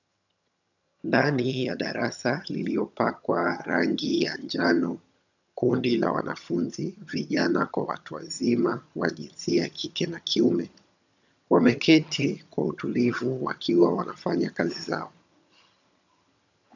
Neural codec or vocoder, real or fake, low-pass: vocoder, 22.05 kHz, 80 mel bands, HiFi-GAN; fake; 7.2 kHz